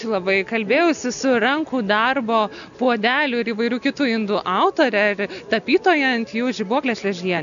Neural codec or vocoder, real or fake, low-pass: none; real; 7.2 kHz